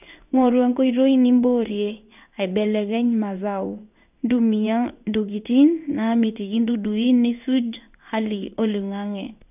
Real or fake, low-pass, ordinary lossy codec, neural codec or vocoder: fake; 3.6 kHz; none; codec, 16 kHz in and 24 kHz out, 1 kbps, XY-Tokenizer